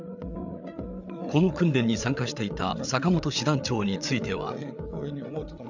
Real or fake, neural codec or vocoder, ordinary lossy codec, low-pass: fake; codec, 16 kHz, 8 kbps, FreqCodec, larger model; none; 7.2 kHz